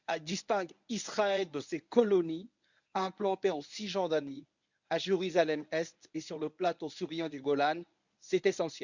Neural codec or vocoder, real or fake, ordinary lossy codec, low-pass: codec, 24 kHz, 0.9 kbps, WavTokenizer, medium speech release version 1; fake; none; 7.2 kHz